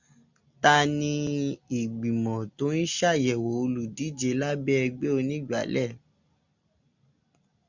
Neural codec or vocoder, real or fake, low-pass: none; real; 7.2 kHz